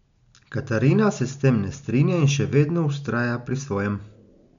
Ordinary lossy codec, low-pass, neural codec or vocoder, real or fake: MP3, 64 kbps; 7.2 kHz; none; real